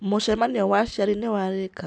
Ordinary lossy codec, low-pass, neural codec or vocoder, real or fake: none; none; none; real